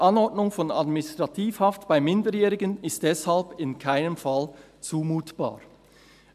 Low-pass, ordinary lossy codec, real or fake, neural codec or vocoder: 14.4 kHz; MP3, 96 kbps; real; none